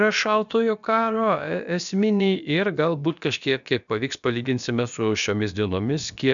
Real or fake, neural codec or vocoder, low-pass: fake; codec, 16 kHz, about 1 kbps, DyCAST, with the encoder's durations; 7.2 kHz